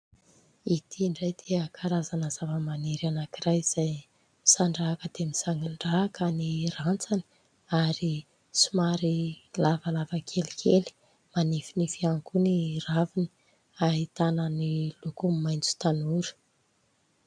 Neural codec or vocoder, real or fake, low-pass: none; real; 9.9 kHz